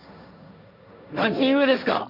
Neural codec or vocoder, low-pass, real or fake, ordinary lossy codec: codec, 16 kHz, 1.1 kbps, Voila-Tokenizer; 5.4 kHz; fake; none